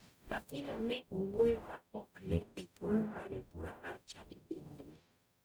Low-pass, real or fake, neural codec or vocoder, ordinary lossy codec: none; fake; codec, 44.1 kHz, 0.9 kbps, DAC; none